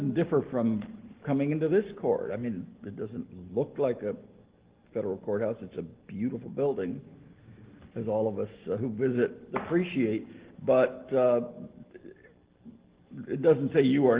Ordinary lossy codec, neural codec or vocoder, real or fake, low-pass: Opus, 16 kbps; none; real; 3.6 kHz